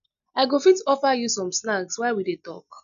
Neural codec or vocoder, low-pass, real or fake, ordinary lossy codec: none; 7.2 kHz; real; none